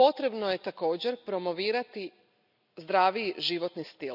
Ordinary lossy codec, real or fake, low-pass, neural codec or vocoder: none; real; 5.4 kHz; none